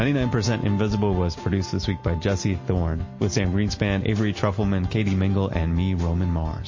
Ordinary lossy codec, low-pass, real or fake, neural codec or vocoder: MP3, 32 kbps; 7.2 kHz; real; none